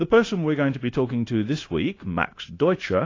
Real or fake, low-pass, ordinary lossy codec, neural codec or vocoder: fake; 7.2 kHz; AAC, 32 kbps; codec, 16 kHz, 0.9 kbps, LongCat-Audio-Codec